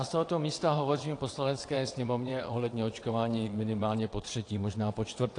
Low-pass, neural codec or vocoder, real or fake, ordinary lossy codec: 9.9 kHz; vocoder, 22.05 kHz, 80 mel bands, WaveNeXt; fake; AAC, 48 kbps